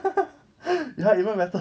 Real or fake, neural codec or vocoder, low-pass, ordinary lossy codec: real; none; none; none